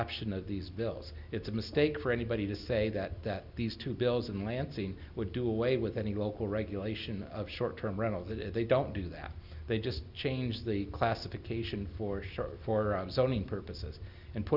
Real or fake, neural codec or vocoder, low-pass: real; none; 5.4 kHz